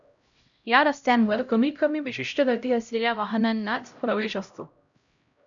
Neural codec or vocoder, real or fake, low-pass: codec, 16 kHz, 0.5 kbps, X-Codec, HuBERT features, trained on LibriSpeech; fake; 7.2 kHz